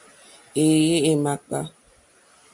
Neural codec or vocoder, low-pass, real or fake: none; 10.8 kHz; real